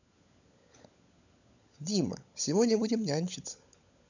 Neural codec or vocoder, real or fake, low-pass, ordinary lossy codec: codec, 16 kHz, 16 kbps, FunCodec, trained on LibriTTS, 50 frames a second; fake; 7.2 kHz; none